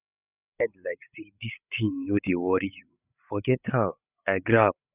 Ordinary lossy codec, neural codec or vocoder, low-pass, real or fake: none; codec, 16 kHz, 8 kbps, FreqCodec, larger model; 3.6 kHz; fake